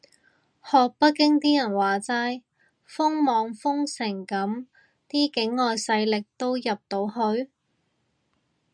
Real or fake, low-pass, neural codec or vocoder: real; 9.9 kHz; none